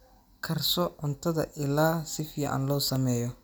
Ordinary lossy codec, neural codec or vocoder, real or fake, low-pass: none; none; real; none